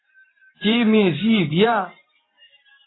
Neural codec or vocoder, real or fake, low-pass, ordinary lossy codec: vocoder, 44.1 kHz, 128 mel bands every 512 samples, BigVGAN v2; fake; 7.2 kHz; AAC, 16 kbps